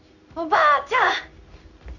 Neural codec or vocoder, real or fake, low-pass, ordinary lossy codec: codec, 16 kHz in and 24 kHz out, 1 kbps, XY-Tokenizer; fake; 7.2 kHz; none